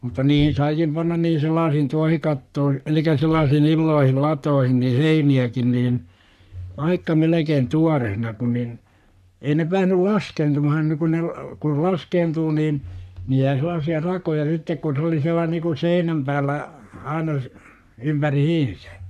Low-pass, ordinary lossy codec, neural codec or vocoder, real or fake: 14.4 kHz; none; codec, 44.1 kHz, 3.4 kbps, Pupu-Codec; fake